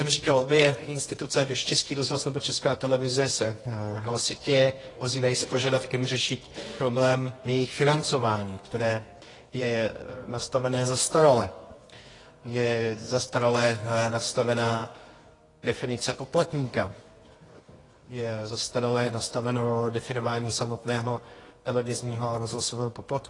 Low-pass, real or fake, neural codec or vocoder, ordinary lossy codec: 10.8 kHz; fake; codec, 24 kHz, 0.9 kbps, WavTokenizer, medium music audio release; AAC, 32 kbps